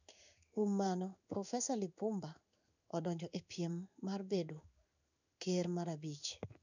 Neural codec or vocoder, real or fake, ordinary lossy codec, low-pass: codec, 16 kHz in and 24 kHz out, 1 kbps, XY-Tokenizer; fake; none; 7.2 kHz